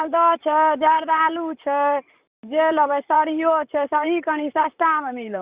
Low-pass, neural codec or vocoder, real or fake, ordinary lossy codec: 3.6 kHz; vocoder, 44.1 kHz, 128 mel bands, Pupu-Vocoder; fake; Opus, 64 kbps